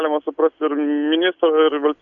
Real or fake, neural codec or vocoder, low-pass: real; none; 7.2 kHz